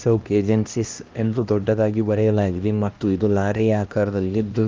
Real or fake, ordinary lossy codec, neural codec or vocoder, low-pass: fake; Opus, 24 kbps; codec, 16 kHz, 1 kbps, X-Codec, HuBERT features, trained on LibriSpeech; 7.2 kHz